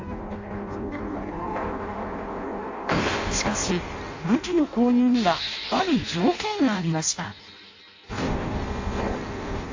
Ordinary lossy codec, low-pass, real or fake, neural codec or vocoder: AAC, 48 kbps; 7.2 kHz; fake; codec, 16 kHz in and 24 kHz out, 0.6 kbps, FireRedTTS-2 codec